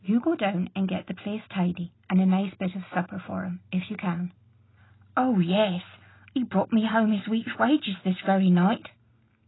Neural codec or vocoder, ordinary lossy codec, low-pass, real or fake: none; AAC, 16 kbps; 7.2 kHz; real